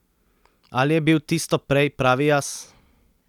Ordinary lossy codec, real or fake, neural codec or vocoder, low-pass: none; real; none; 19.8 kHz